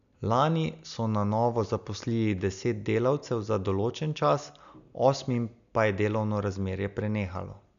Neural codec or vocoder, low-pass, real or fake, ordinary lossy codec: none; 7.2 kHz; real; MP3, 96 kbps